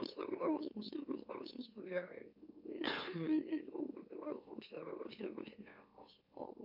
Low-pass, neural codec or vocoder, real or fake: 5.4 kHz; autoencoder, 44.1 kHz, a latent of 192 numbers a frame, MeloTTS; fake